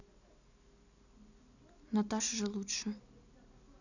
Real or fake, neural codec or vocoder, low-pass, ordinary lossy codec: real; none; 7.2 kHz; none